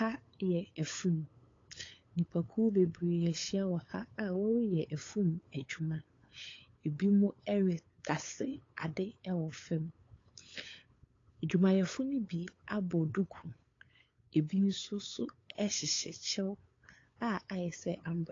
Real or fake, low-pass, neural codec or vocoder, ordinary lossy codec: fake; 7.2 kHz; codec, 16 kHz, 8 kbps, FunCodec, trained on LibriTTS, 25 frames a second; AAC, 32 kbps